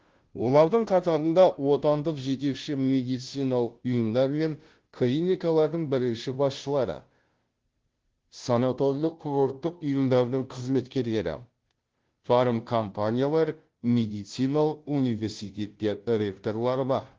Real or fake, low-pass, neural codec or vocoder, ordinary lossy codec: fake; 7.2 kHz; codec, 16 kHz, 0.5 kbps, FunCodec, trained on Chinese and English, 25 frames a second; Opus, 16 kbps